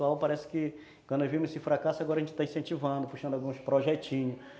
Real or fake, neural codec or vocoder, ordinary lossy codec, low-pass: real; none; none; none